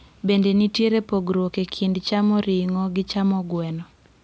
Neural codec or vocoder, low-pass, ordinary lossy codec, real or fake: none; none; none; real